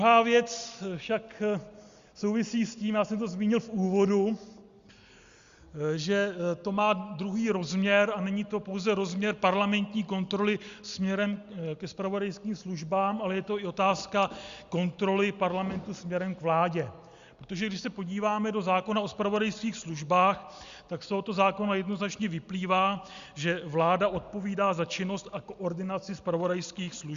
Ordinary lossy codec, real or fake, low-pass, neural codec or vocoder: Opus, 64 kbps; real; 7.2 kHz; none